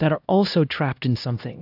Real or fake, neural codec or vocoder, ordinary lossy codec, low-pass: fake; codec, 16 kHz, 1 kbps, X-Codec, HuBERT features, trained on LibriSpeech; AAC, 48 kbps; 5.4 kHz